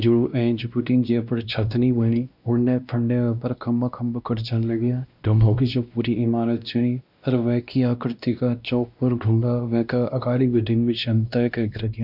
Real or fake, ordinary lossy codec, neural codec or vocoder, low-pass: fake; none; codec, 16 kHz, 1 kbps, X-Codec, WavLM features, trained on Multilingual LibriSpeech; 5.4 kHz